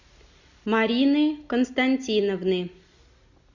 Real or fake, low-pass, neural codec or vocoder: real; 7.2 kHz; none